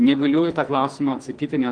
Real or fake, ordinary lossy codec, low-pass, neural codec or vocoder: fake; Opus, 24 kbps; 9.9 kHz; codec, 44.1 kHz, 2.6 kbps, SNAC